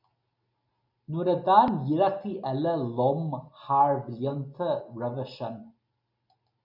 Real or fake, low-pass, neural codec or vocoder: real; 5.4 kHz; none